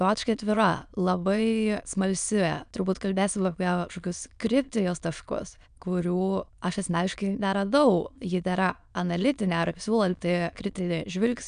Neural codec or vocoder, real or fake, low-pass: autoencoder, 22.05 kHz, a latent of 192 numbers a frame, VITS, trained on many speakers; fake; 9.9 kHz